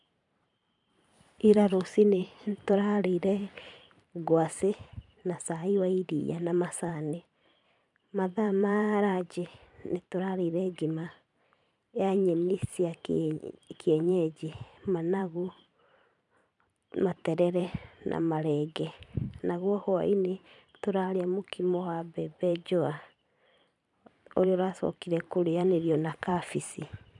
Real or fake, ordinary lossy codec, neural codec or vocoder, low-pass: fake; none; vocoder, 44.1 kHz, 128 mel bands every 512 samples, BigVGAN v2; 10.8 kHz